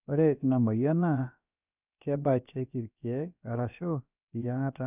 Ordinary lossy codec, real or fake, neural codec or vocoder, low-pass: none; fake; codec, 16 kHz, about 1 kbps, DyCAST, with the encoder's durations; 3.6 kHz